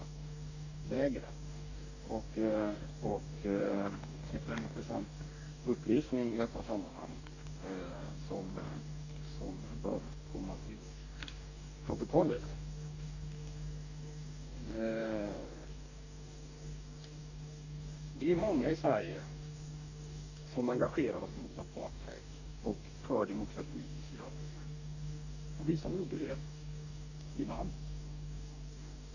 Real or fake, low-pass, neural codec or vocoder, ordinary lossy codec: fake; 7.2 kHz; codec, 44.1 kHz, 2.6 kbps, DAC; none